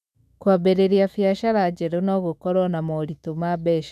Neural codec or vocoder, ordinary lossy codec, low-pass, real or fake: autoencoder, 48 kHz, 128 numbers a frame, DAC-VAE, trained on Japanese speech; none; 14.4 kHz; fake